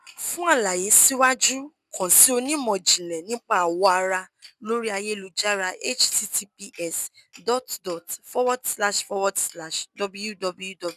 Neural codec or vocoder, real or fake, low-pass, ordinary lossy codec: none; real; 14.4 kHz; none